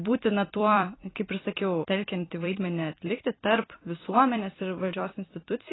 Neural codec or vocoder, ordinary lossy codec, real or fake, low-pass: vocoder, 44.1 kHz, 128 mel bands every 256 samples, BigVGAN v2; AAC, 16 kbps; fake; 7.2 kHz